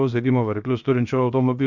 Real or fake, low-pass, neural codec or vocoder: fake; 7.2 kHz; codec, 16 kHz, 0.7 kbps, FocalCodec